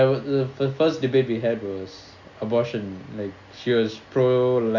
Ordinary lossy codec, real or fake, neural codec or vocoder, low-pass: MP3, 64 kbps; real; none; 7.2 kHz